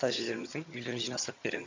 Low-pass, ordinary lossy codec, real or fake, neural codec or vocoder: 7.2 kHz; MP3, 48 kbps; fake; vocoder, 22.05 kHz, 80 mel bands, HiFi-GAN